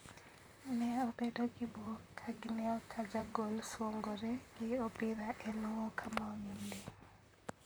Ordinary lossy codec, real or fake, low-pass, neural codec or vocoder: none; fake; none; vocoder, 44.1 kHz, 128 mel bands, Pupu-Vocoder